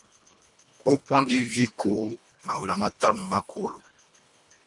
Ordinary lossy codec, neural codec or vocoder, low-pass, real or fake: MP3, 64 kbps; codec, 24 kHz, 1.5 kbps, HILCodec; 10.8 kHz; fake